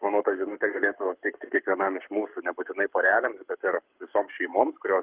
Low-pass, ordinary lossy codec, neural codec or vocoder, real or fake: 3.6 kHz; Opus, 32 kbps; codec, 44.1 kHz, 7.8 kbps, Pupu-Codec; fake